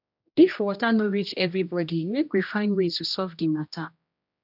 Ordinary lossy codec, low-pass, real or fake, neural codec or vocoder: none; 5.4 kHz; fake; codec, 16 kHz, 1 kbps, X-Codec, HuBERT features, trained on general audio